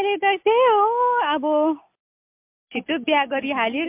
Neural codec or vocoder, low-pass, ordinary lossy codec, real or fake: none; 3.6 kHz; MP3, 32 kbps; real